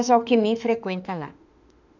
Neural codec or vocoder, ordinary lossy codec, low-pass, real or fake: autoencoder, 48 kHz, 32 numbers a frame, DAC-VAE, trained on Japanese speech; none; 7.2 kHz; fake